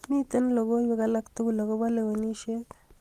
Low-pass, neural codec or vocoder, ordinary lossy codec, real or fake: 14.4 kHz; none; Opus, 24 kbps; real